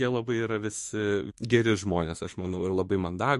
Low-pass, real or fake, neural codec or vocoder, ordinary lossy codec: 14.4 kHz; fake; autoencoder, 48 kHz, 32 numbers a frame, DAC-VAE, trained on Japanese speech; MP3, 48 kbps